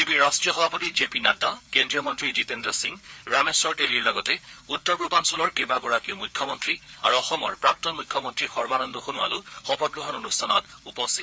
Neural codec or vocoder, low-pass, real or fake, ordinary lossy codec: codec, 16 kHz, 4 kbps, FreqCodec, larger model; none; fake; none